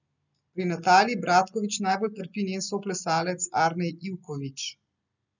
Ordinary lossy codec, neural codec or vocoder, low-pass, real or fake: none; none; 7.2 kHz; real